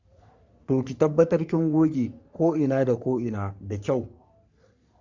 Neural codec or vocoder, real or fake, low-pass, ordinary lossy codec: codec, 44.1 kHz, 3.4 kbps, Pupu-Codec; fake; 7.2 kHz; Opus, 64 kbps